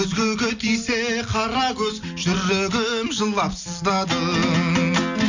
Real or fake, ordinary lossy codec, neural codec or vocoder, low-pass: real; none; none; 7.2 kHz